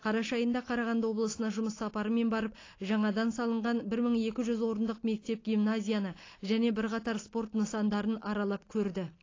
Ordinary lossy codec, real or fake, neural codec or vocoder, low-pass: AAC, 32 kbps; real; none; 7.2 kHz